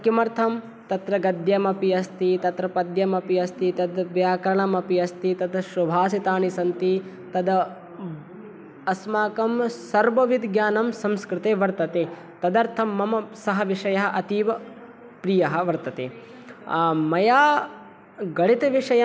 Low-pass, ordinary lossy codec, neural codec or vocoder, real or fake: none; none; none; real